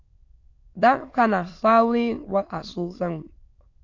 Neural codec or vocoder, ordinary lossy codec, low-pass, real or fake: autoencoder, 22.05 kHz, a latent of 192 numbers a frame, VITS, trained on many speakers; AAC, 48 kbps; 7.2 kHz; fake